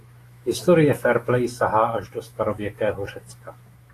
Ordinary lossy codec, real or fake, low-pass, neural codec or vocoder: AAC, 48 kbps; fake; 14.4 kHz; autoencoder, 48 kHz, 128 numbers a frame, DAC-VAE, trained on Japanese speech